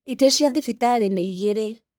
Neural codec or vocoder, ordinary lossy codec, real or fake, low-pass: codec, 44.1 kHz, 1.7 kbps, Pupu-Codec; none; fake; none